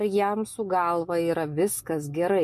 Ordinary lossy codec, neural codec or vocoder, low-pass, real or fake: MP3, 64 kbps; none; 14.4 kHz; real